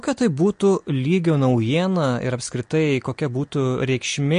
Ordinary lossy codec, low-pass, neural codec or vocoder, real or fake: MP3, 48 kbps; 9.9 kHz; none; real